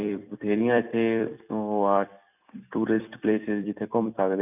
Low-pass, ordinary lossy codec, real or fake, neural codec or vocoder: 3.6 kHz; none; fake; vocoder, 44.1 kHz, 128 mel bands every 256 samples, BigVGAN v2